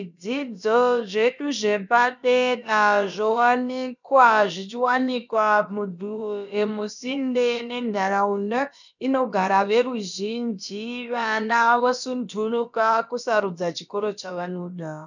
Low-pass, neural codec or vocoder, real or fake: 7.2 kHz; codec, 16 kHz, about 1 kbps, DyCAST, with the encoder's durations; fake